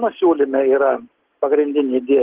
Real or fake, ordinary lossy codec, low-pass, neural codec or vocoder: real; Opus, 16 kbps; 3.6 kHz; none